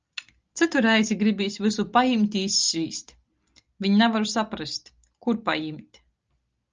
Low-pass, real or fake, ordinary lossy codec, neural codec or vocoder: 7.2 kHz; real; Opus, 24 kbps; none